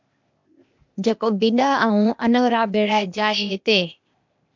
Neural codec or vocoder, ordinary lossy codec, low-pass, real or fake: codec, 16 kHz, 0.8 kbps, ZipCodec; MP3, 64 kbps; 7.2 kHz; fake